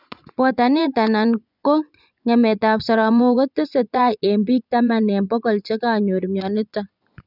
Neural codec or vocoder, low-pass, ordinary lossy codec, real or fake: vocoder, 24 kHz, 100 mel bands, Vocos; 5.4 kHz; none; fake